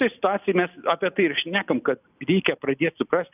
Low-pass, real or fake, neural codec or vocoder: 3.6 kHz; real; none